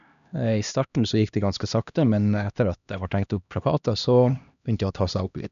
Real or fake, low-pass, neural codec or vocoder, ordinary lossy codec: fake; 7.2 kHz; codec, 16 kHz, 1 kbps, X-Codec, HuBERT features, trained on LibriSpeech; none